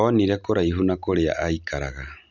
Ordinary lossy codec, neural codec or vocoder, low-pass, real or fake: none; none; 7.2 kHz; real